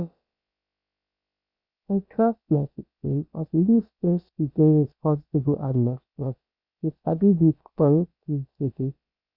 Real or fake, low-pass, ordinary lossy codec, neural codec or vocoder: fake; 5.4 kHz; Opus, 64 kbps; codec, 16 kHz, about 1 kbps, DyCAST, with the encoder's durations